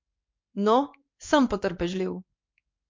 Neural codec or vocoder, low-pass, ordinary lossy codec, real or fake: codec, 16 kHz in and 24 kHz out, 1 kbps, XY-Tokenizer; 7.2 kHz; MP3, 48 kbps; fake